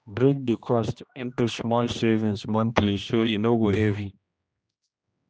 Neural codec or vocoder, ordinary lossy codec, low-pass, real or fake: codec, 16 kHz, 1 kbps, X-Codec, HuBERT features, trained on general audio; none; none; fake